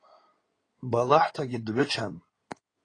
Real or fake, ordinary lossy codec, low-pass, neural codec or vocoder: fake; AAC, 32 kbps; 9.9 kHz; codec, 16 kHz in and 24 kHz out, 2.2 kbps, FireRedTTS-2 codec